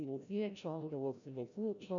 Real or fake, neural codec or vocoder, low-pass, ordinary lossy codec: fake; codec, 16 kHz, 0.5 kbps, FreqCodec, larger model; 7.2 kHz; MP3, 96 kbps